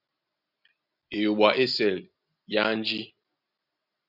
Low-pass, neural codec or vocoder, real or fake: 5.4 kHz; none; real